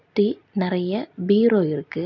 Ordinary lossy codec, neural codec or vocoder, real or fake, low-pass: none; none; real; 7.2 kHz